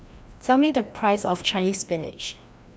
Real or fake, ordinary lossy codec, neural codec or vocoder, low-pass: fake; none; codec, 16 kHz, 1 kbps, FreqCodec, larger model; none